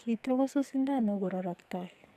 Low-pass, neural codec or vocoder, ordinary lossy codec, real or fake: 14.4 kHz; codec, 44.1 kHz, 2.6 kbps, SNAC; none; fake